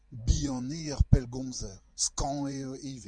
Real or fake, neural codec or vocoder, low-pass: fake; vocoder, 24 kHz, 100 mel bands, Vocos; 9.9 kHz